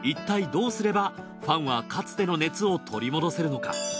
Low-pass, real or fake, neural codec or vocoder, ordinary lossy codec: none; real; none; none